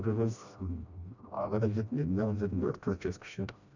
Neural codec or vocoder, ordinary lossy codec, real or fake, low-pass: codec, 16 kHz, 1 kbps, FreqCodec, smaller model; none; fake; 7.2 kHz